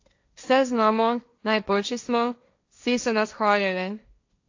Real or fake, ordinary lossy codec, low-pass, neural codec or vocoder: fake; AAC, 48 kbps; 7.2 kHz; codec, 16 kHz, 1.1 kbps, Voila-Tokenizer